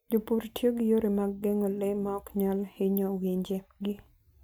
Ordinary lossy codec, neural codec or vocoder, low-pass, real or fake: none; none; none; real